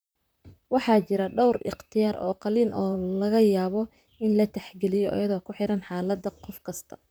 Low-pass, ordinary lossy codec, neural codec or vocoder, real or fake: none; none; vocoder, 44.1 kHz, 128 mel bands, Pupu-Vocoder; fake